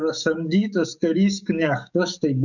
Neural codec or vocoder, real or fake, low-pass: codec, 44.1 kHz, 7.8 kbps, DAC; fake; 7.2 kHz